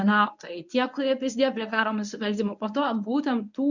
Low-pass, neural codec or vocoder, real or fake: 7.2 kHz; codec, 24 kHz, 0.9 kbps, WavTokenizer, medium speech release version 1; fake